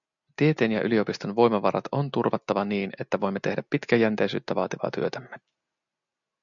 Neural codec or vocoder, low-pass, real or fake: none; 7.2 kHz; real